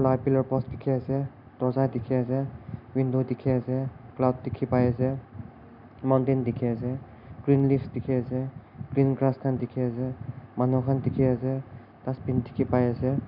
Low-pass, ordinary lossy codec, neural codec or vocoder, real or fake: 5.4 kHz; none; none; real